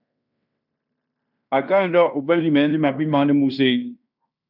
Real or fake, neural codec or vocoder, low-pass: fake; codec, 16 kHz in and 24 kHz out, 0.9 kbps, LongCat-Audio-Codec, fine tuned four codebook decoder; 5.4 kHz